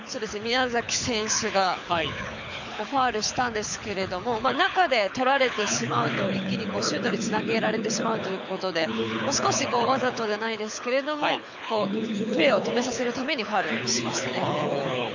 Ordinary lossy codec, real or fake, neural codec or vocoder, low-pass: none; fake; codec, 24 kHz, 6 kbps, HILCodec; 7.2 kHz